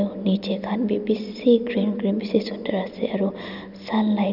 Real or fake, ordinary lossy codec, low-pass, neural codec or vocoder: real; none; 5.4 kHz; none